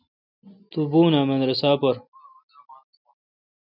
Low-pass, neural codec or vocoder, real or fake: 5.4 kHz; none; real